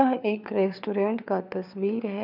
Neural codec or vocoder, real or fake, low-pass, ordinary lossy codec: codec, 16 kHz, 4 kbps, FunCodec, trained on LibriTTS, 50 frames a second; fake; 5.4 kHz; none